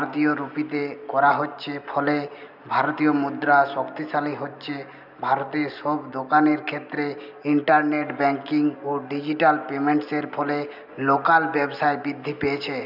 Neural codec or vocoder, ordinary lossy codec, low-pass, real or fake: none; none; 5.4 kHz; real